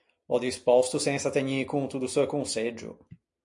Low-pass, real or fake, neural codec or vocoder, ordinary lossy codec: 10.8 kHz; real; none; AAC, 64 kbps